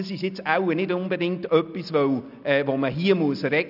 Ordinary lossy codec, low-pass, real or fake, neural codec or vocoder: none; 5.4 kHz; real; none